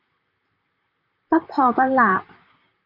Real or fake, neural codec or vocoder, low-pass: fake; vocoder, 44.1 kHz, 128 mel bands, Pupu-Vocoder; 5.4 kHz